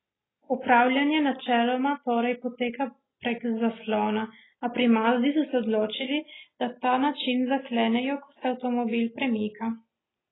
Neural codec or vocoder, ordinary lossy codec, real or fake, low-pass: none; AAC, 16 kbps; real; 7.2 kHz